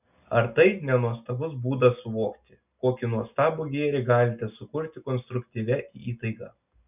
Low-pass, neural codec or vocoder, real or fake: 3.6 kHz; none; real